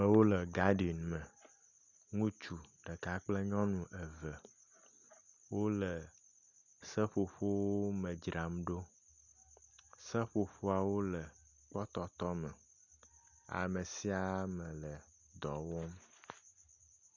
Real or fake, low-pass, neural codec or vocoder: real; 7.2 kHz; none